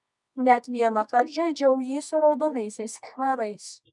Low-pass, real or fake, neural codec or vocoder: 10.8 kHz; fake; codec, 24 kHz, 0.9 kbps, WavTokenizer, medium music audio release